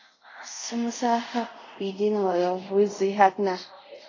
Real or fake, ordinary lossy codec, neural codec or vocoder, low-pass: fake; AAC, 32 kbps; codec, 24 kHz, 0.5 kbps, DualCodec; 7.2 kHz